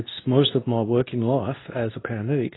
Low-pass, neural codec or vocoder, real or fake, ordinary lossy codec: 7.2 kHz; codec, 24 kHz, 0.9 kbps, WavTokenizer, medium speech release version 2; fake; AAC, 16 kbps